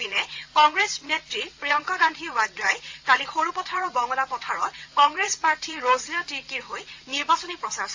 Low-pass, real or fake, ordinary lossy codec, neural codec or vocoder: 7.2 kHz; fake; AAC, 48 kbps; vocoder, 22.05 kHz, 80 mel bands, WaveNeXt